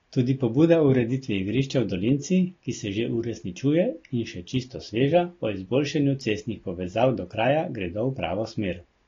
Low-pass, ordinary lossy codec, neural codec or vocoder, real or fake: 7.2 kHz; AAC, 32 kbps; none; real